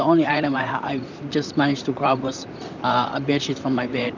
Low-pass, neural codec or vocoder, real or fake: 7.2 kHz; vocoder, 44.1 kHz, 128 mel bands, Pupu-Vocoder; fake